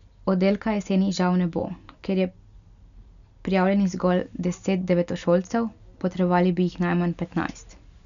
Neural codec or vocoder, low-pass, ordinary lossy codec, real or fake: none; 7.2 kHz; none; real